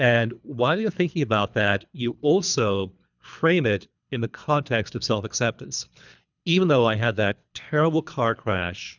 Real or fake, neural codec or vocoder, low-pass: fake; codec, 24 kHz, 3 kbps, HILCodec; 7.2 kHz